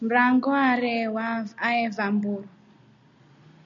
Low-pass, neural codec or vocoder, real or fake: 7.2 kHz; none; real